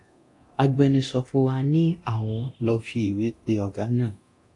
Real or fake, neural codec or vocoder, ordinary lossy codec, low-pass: fake; codec, 24 kHz, 0.9 kbps, DualCodec; AAC, 48 kbps; 10.8 kHz